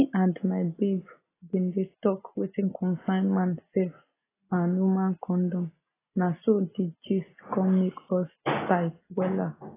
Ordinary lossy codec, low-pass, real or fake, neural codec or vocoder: AAC, 16 kbps; 3.6 kHz; real; none